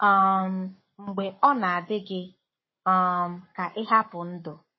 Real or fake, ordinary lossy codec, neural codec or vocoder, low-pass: fake; MP3, 24 kbps; codec, 44.1 kHz, 7.8 kbps, Pupu-Codec; 7.2 kHz